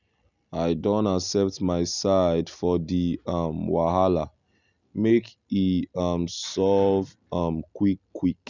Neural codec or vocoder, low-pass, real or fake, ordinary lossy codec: none; 7.2 kHz; real; none